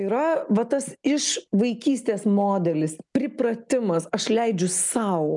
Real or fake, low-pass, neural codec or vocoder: real; 10.8 kHz; none